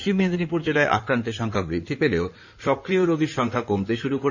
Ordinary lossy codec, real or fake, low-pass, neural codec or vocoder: none; fake; 7.2 kHz; codec, 16 kHz in and 24 kHz out, 2.2 kbps, FireRedTTS-2 codec